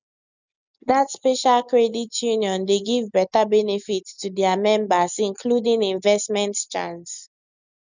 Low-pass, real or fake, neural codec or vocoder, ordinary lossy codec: 7.2 kHz; real; none; none